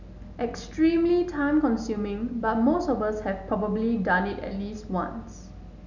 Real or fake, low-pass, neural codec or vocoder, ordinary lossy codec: real; 7.2 kHz; none; none